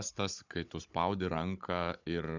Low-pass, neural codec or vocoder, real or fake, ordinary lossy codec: 7.2 kHz; codec, 16 kHz, 16 kbps, FunCodec, trained on Chinese and English, 50 frames a second; fake; Opus, 64 kbps